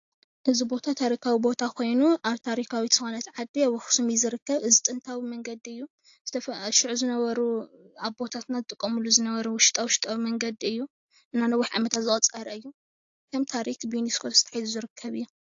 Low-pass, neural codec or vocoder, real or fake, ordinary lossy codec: 7.2 kHz; none; real; AAC, 48 kbps